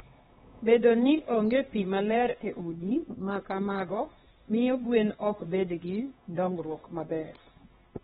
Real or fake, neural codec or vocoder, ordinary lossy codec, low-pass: fake; codec, 16 kHz, 2 kbps, X-Codec, HuBERT features, trained on LibriSpeech; AAC, 16 kbps; 7.2 kHz